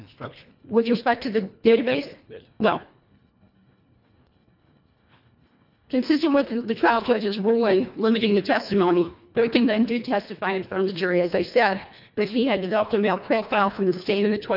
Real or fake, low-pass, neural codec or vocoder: fake; 5.4 kHz; codec, 24 kHz, 1.5 kbps, HILCodec